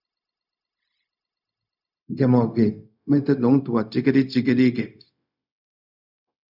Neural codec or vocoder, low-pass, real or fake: codec, 16 kHz, 0.4 kbps, LongCat-Audio-Codec; 5.4 kHz; fake